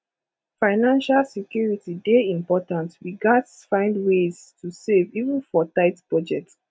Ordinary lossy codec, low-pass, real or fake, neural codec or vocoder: none; none; real; none